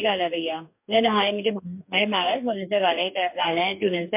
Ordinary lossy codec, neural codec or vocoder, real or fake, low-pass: MP3, 32 kbps; codec, 44.1 kHz, 2.6 kbps, DAC; fake; 3.6 kHz